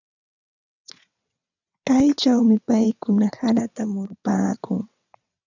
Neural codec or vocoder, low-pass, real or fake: vocoder, 22.05 kHz, 80 mel bands, WaveNeXt; 7.2 kHz; fake